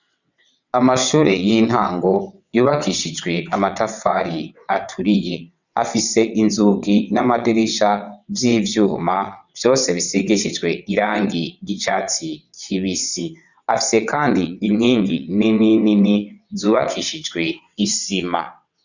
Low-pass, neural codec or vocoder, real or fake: 7.2 kHz; vocoder, 22.05 kHz, 80 mel bands, WaveNeXt; fake